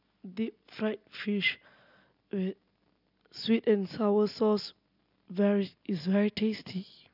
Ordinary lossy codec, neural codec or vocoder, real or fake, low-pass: none; none; real; 5.4 kHz